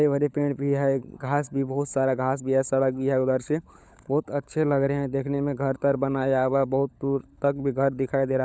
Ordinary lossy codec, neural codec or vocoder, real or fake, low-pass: none; codec, 16 kHz, 16 kbps, FunCodec, trained on LibriTTS, 50 frames a second; fake; none